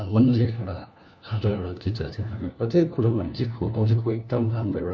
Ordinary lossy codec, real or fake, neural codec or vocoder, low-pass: none; fake; codec, 16 kHz, 1 kbps, FunCodec, trained on LibriTTS, 50 frames a second; none